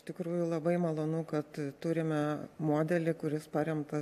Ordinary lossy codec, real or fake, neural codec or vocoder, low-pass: AAC, 96 kbps; real; none; 14.4 kHz